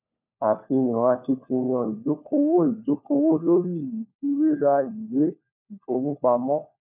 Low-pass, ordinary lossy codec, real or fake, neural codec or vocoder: 3.6 kHz; none; fake; codec, 16 kHz, 4 kbps, FunCodec, trained on LibriTTS, 50 frames a second